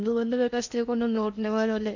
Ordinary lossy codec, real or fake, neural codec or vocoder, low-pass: none; fake; codec, 16 kHz in and 24 kHz out, 0.8 kbps, FocalCodec, streaming, 65536 codes; 7.2 kHz